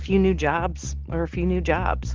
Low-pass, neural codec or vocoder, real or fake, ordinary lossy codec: 7.2 kHz; none; real; Opus, 16 kbps